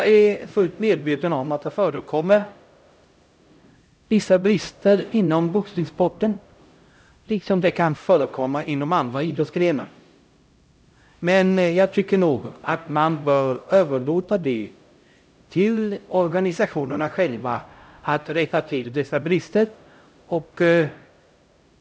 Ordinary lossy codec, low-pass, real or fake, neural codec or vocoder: none; none; fake; codec, 16 kHz, 0.5 kbps, X-Codec, HuBERT features, trained on LibriSpeech